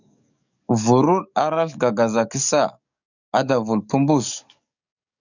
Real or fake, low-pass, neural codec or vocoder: fake; 7.2 kHz; codec, 44.1 kHz, 7.8 kbps, DAC